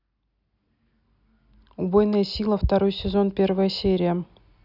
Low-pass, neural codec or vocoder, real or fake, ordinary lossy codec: 5.4 kHz; none; real; none